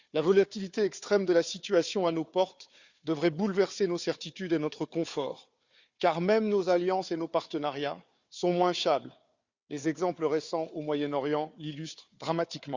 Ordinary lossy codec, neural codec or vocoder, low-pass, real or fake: Opus, 64 kbps; codec, 16 kHz, 4 kbps, FunCodec, trained on Chinese and English, 50 frames a second; 7.2 kHz; fake